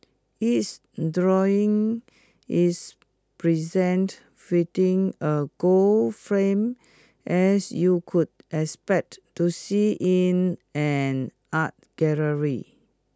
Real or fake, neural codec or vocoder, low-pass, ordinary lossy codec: real; none; none; none